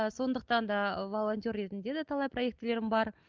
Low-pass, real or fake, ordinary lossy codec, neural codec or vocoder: 7.2 kHz; real; Opus, 32 kbps; none